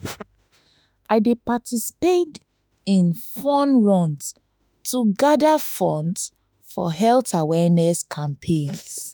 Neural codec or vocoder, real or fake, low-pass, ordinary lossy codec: autoencoder, 48 kHz, 32 numbers a frame, DAC-VAE, trained on Japanese speech; fake; none; none